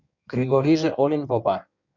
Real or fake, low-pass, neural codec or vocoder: fake; 7.2 kHz; codec, 16 kHz in and 24 kHz out, 1.1 kbps, FireRedTTS-2 codec